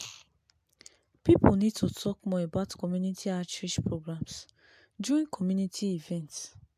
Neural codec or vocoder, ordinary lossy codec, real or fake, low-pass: none; AAC, 96 kbps; real; 14.4 kHz